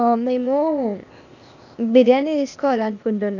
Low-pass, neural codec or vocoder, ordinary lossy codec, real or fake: 7.2 kHz; codec, 16 kHz, 0.8 kbps, ZipCodec; none; fake